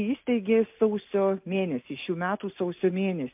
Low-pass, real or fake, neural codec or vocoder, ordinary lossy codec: 3.6 kHz; real; none; MP3, 32 kbps